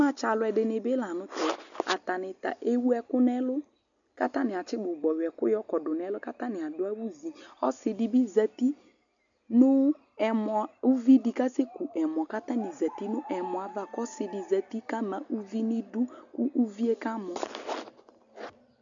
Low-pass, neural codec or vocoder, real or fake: 7.2 kHz; none; real